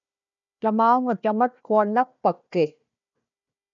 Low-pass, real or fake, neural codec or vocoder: 7.2 kHz; fake; codec, 16 kHz, 1 kbps, FunCodec, trained on Chinese and English, 50 frames a second